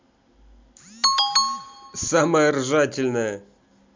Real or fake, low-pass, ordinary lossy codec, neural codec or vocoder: real; 7.2 kHz; MP3, 64 kbps; none